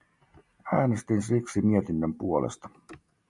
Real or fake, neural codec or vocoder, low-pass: real; none; 10.8 kHz